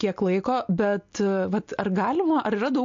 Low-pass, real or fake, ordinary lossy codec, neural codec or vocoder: 7.2 kHz; real; MP3, 48 kbps; none